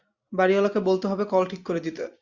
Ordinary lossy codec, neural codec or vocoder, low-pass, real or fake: Opus, 64 kbps; none; 7.2 kHz; real